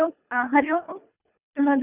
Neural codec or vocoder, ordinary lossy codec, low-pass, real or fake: codec, 24 kHz, 3 kbps, HILCodec; none; 3.6 kHz; fake